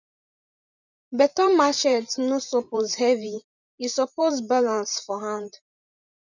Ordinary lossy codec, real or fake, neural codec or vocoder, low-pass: none; fake; vocoder, 44.1 kHz, 128 mel bands every 512 samples, BigVGAN v2; 7.2 kHz